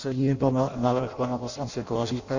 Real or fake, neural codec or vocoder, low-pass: fake; codec, 16 kHz in and 24 kHz out, 0.6 kbps, FireRedTTS-2 codec; 7.2 kHz